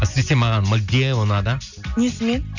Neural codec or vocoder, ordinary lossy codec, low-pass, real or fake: none; none; 7.2 kHz; real